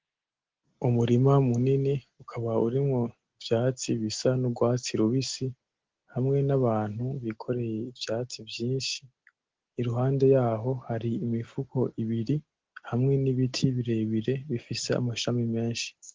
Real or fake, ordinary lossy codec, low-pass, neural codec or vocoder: real; Opus, 16 kbps; 7.2 kHz; none